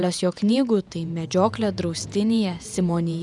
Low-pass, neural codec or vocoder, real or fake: 10.8 kHz; vocoder, 48 kHz, 128 mel bands, Vocos; fake